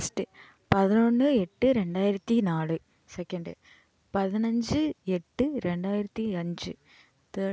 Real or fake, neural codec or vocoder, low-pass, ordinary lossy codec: real; none; none; none